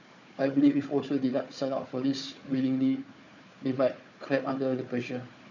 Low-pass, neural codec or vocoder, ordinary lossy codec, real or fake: 7.2 kHz; codec, 16 kHz, 4 kbps, FunCodec, trained on Chinese and English, 50 frames a second; none; fake